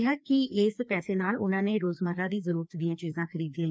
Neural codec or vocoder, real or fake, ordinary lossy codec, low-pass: codec, 16 kHz, 2 kbps, FreqCodec, larger model; fake; none; none